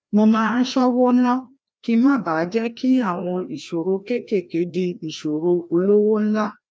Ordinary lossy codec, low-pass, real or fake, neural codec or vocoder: none; none; fake; codec, 16 kHz, 1 kbps, FreqCodec, larger model